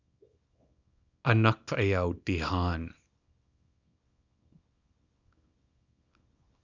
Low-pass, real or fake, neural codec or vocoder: 7.2 kHz; fake; codec, 24 kHz, 0.9 kbps, WavTokenizer, small release